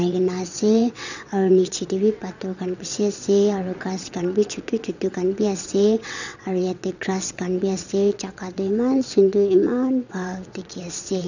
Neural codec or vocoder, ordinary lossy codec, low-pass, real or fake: vocoder, 22.05 kHz, 80 mel bands, WaveNeXt; none; 7.2 kHz; fake